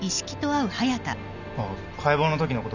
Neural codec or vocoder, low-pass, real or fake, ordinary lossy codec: none; 7.2 kHz; real; none